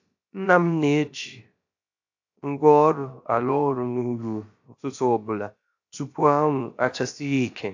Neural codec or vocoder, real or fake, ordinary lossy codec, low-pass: codec, 16 kHz, about 1 kbps, DyCAST, with the encoder's durations; fake; AAC, 48 kbps; 7.2 kHz